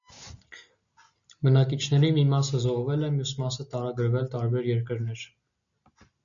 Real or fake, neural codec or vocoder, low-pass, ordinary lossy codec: real; none; 7.2 kHz; MP3, 96 kbps